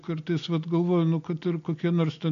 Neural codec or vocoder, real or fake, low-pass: none; real; 7.2 kHz